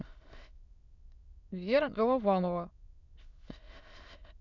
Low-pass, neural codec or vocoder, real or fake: 7.2 kHz; autoencoder, 22.05 kHz, a latent of 192 numbers a frame, VITS, trained on many speakers; fake